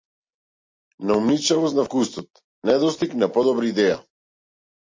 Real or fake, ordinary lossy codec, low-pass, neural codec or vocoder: real; MP3, 32 kbps; 7.2 kHz; none